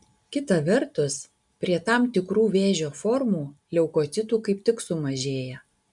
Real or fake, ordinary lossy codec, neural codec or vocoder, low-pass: real; MP3, 96 kbps; none; 10.8 kHz